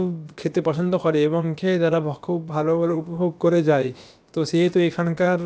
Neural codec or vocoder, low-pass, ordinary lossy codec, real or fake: codec, 16 kHz, about 1 kbps, DyCAST, with the encoder's durations; none; none; fake